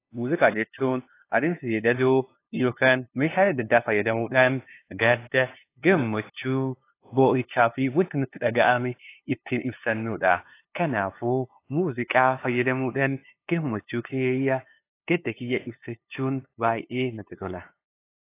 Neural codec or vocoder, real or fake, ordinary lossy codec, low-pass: codec, 16 kHz, 2 kbps, FunCodec, trained on LibriTTS, 25 frames a second; fake; AAC, 24 kbps; 3.6 kHz